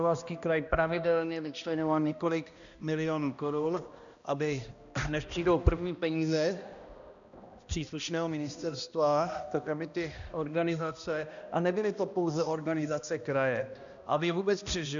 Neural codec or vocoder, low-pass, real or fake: codec, 16 kHz, 1 kbps, X-Codec, HuBERT features, trained on balanced general audio; 7.2 kHz; fake